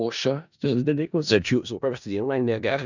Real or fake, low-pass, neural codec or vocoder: fake; 7.2 kHz; codec, 16 kHz in and 24 kHz out, 0.4 kbps, LongCat-Audio-Codec, four codebook decoder